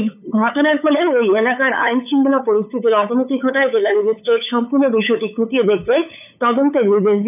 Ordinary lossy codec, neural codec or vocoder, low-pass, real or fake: none; codec, 16 kHz, 8 kbps, FunCodec, trained on LibriTTS, 25 frames a second; 3.6 kHz; fake